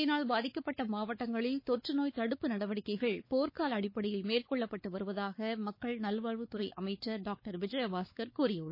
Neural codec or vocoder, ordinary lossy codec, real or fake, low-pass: codec, 16 kHz, 4 kbps, X-Codec, WavLM features, trained on Multilingual LibriSpeech; MP3, 24 kbps; fake; 5.4 kHz